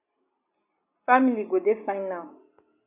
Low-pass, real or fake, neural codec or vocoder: 3.6 kHz; real; none